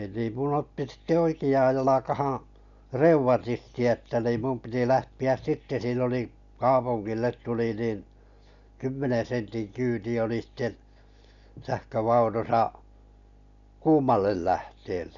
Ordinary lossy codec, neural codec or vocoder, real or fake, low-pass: none; none; real; 7.2 kHz